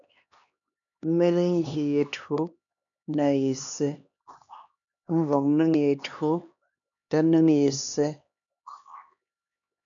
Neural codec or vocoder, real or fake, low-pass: codec, 16 kHz, 2 kbps, X-Codec, HuBERT features, trained on LibriSpeech; fake; 7.2 kHz